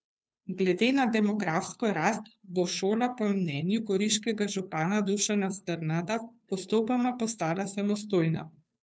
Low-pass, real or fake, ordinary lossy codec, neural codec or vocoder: none; fake; none; codec, 16 kHz, 2 kbps, FunCodec, trained on Chinese and English, 25 frames a second